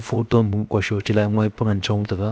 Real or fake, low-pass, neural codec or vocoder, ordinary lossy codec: fake; none; codec, 16 kHz, 0.7 kbps, FocalCodec; none